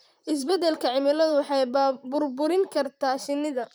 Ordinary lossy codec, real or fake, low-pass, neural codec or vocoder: none; fake; none; vocoder, 44.1 kHz, 128 mel bands, Pupu-Vocoder